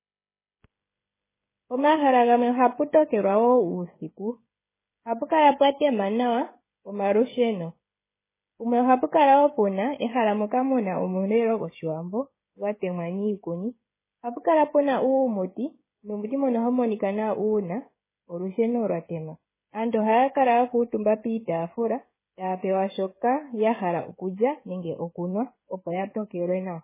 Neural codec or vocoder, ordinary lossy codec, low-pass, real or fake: codec, 16 kHz, 16 kbps, FreqCodec, smaller model; MP3, 16 kbps; 3.6 kHz; fake